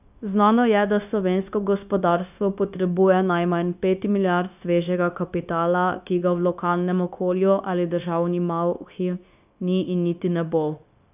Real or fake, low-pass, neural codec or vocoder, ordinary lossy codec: fake; 3.6 kHz; codec, 16 kHz, 0.9 kbps, LongCat-Audio-Codec; none